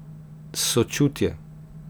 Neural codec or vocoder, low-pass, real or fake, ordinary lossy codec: none; none; real; none